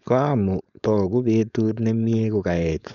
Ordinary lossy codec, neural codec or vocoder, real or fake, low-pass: none; codec, 16 kHz, 4.8 kbps, FACodec; fake; 7.2 kHz